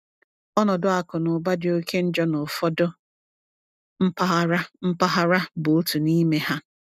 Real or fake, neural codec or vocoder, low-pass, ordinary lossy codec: real; none; 14.4 kHz; none